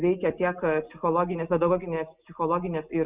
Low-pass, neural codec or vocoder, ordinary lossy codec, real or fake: 3.6 kHz; none; Opus, 64 kbps; real